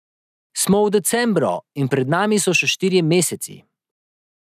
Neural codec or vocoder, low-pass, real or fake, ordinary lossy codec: none; 14.4 kHz; real; none